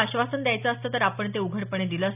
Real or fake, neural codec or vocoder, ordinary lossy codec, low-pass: real; none; none; 3.6 kHz